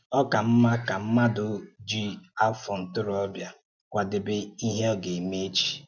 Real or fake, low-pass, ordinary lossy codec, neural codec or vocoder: real; 7.2 kHz; none; none